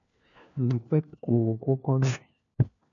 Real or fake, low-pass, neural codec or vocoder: fake; 7.2 kHz; codec, 16 kHz, 1 kbps, FunCodec, trained on LibriTTS, 50 frames a second